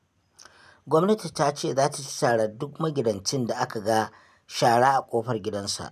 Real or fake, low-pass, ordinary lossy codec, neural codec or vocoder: real; 14.4 kHz; none; none